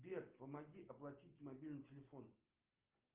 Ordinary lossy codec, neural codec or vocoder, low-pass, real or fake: Opus, 32 kbps; none; 3.6 kHz; real